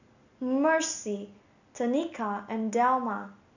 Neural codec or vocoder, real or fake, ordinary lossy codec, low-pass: none; real; none; 7.2 kHz